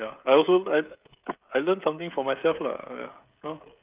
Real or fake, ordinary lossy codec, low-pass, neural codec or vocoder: fake; Opus, 24 kbps; 3.6 kHz; codec, 16 kHz, 16 kbps, FreqCodec, smaller model